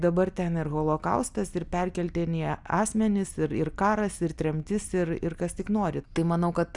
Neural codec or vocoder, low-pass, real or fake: none; 10.8 kHz; real